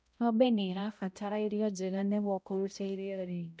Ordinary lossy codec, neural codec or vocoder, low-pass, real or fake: none; codec, 16 kHz, 0.5 kbps, X-Codec, HuBERT features, trained on balanced general audio; none; fake